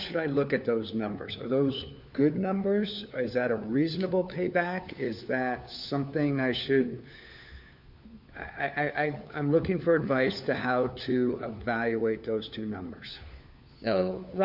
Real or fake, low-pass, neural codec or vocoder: fake; 5.4 kHz; codec, 16 kHz, 4 kbps, FunCodec, trained on LibriTTS, 50 frames a second